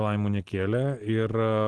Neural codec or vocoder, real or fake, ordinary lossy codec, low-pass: none; real; Opus, 16 kbps; 10.8 kHz